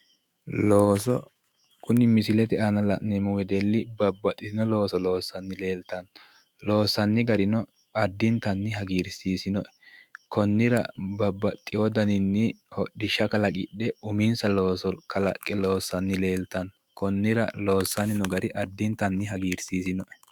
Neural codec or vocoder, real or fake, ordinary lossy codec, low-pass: autoencoder, 48 kHz, 128 numbers a frame, DAC-VAE, trained on Japanese speech; fake; Opus, 64 kbps; 19.8 kHz